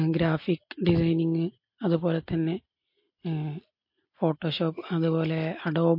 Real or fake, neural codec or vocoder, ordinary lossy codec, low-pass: real; none; MP3, 32 kbps; 5.4 kHz